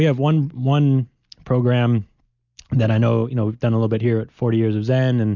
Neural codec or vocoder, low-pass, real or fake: none; 7.2 kHz; real